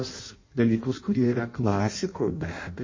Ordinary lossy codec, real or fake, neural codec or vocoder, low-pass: MP3, 32 kbps; fake; codec, 16 kHz in and 24 kHz out, 0.6 kbps, FireRedTTS-2 codec; 7.2 kHz